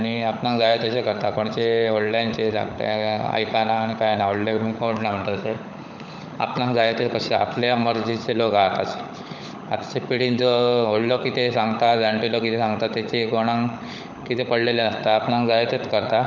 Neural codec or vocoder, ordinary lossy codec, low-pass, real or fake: codec, 16 kHz, 16 kbps, FunCodec, trained on LibriTTS, 50 frames a second; none; 7.2 kHz; fake